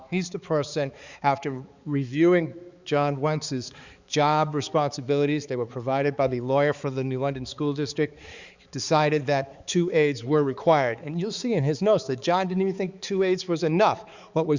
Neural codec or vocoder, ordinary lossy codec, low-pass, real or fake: codec, 16 kHz, 4 kbps, X-Codec, HuBERT features, trained on balanced general audio; Opus, 64 kbps; 7.2 kHz; fake